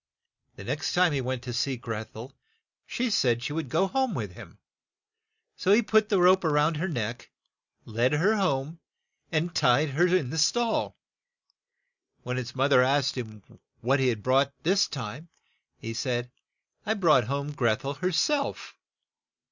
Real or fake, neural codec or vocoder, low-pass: real; none; 7.2 kHz